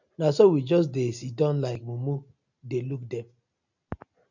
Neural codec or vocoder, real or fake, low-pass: none; real; 7.2 kHz